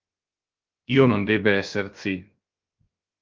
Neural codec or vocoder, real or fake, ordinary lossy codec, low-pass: codec, 16 kHz, 0.7 kbps, FocalCodec; fake; Opus, 32 kbps; 7.2 kHz